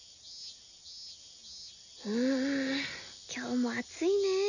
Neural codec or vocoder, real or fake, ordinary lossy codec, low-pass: none; real; none; 7.2 kHz